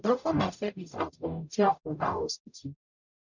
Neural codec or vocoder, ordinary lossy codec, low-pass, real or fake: codec, 44.1 kHz, 0.9 kbps, DAC; none; 7.2 kHz; fake